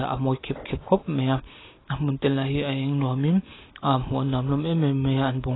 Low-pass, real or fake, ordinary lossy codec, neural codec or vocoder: 7.2 kHz; real; AAC, 16 kbps; none